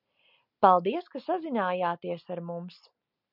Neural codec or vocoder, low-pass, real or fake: none; 5.4 kHz; real